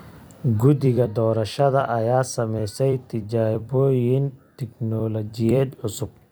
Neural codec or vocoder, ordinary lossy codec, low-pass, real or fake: vocoder, 44.1 kHz, 128 mel bands every 256 samples, BigVGAN v2; none; none; fake